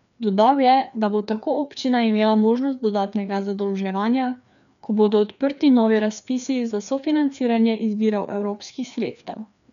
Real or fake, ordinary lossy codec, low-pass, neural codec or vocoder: fake; none; 7.2 kHz; codec, 16 kHz, 2 kbps, FreqCodec, larger model